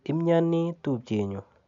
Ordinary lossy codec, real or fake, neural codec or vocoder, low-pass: none; real; none; 7.2 kHz